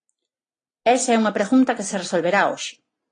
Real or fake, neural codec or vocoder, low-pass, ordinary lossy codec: real; none; 9.9 kHz; AAC, 32 kbps